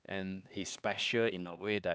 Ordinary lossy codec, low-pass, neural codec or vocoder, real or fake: none; none; codec, 16 kHz, 2 kbps, X-Codec, HuBERT features, trained on LibriSpeech; fake